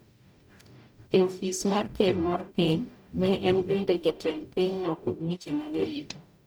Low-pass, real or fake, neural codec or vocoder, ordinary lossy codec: none; fake; codec, 44.1 kHz, 0.9 kbps, DAC; none